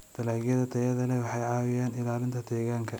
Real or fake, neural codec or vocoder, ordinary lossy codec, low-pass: real; none; none; none